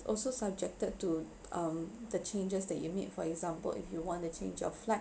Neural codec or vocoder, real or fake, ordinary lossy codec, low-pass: none; real; none; none